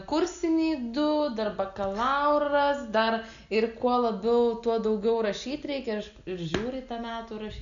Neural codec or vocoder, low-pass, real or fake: none; 7.2 kHz; real